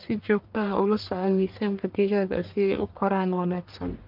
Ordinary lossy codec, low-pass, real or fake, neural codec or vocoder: Opus, 32 kbps; 5.4 kHz; fake; codec, 44.1 kHz, 1.7 kbps, Pupu-Codec